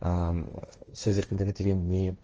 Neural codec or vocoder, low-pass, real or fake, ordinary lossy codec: codec, 16 kHz, 1.1 kbps, Voila-Tokenizer; 7.2 kHz; fake; Opus, 24 kbps